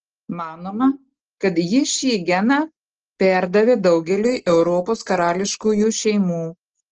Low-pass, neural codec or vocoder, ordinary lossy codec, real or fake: 7.2 kHz; none; Opus, 16 kbps; real